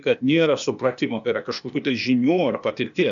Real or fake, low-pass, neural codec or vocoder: fake; 7.2 kHz; codec, 16 kHz, 0.8 kbps, ZipCodec